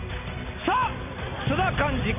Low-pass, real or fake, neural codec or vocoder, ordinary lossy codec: 3.6 kHz; fake; vocoder, 44.1 kHz, 128 mel bands every 256 samples, BigVGAN v2; none